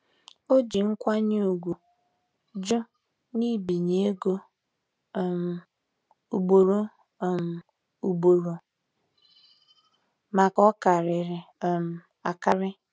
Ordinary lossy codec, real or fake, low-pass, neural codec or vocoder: none; real; none; none